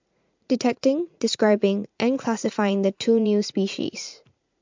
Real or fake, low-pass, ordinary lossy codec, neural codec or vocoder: fake; 7.2 kHz; MP3, 64 kbps; vocoder, 44.1 kHz, 80 mel bands, Vocos